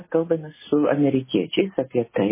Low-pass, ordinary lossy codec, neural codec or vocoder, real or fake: 3.6 kHz; MP3, 16 kbps; none; real